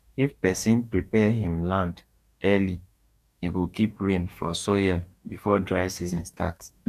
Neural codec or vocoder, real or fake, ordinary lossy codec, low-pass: codec, 44.1 kHz, 2.6 kbps, DAC; fake; none; 14.4 kHz